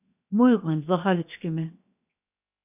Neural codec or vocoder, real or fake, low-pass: codec, 16 kHz, 0.7 kbps, FocalCodec; fake; 3.6 kHz